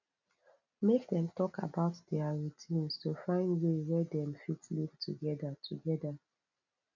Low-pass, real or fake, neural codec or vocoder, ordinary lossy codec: 7.2 kHz; real; none; none